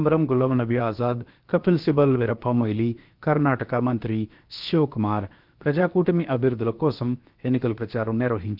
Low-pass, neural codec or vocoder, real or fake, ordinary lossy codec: 5.4 kHz; codec, 16 kHz, 0.7 kbps, FocalCodec; fake; Opus, 24 kbps